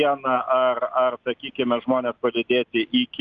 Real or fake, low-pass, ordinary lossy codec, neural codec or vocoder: real; 7.2 kHz; Opus, 32 kbps; none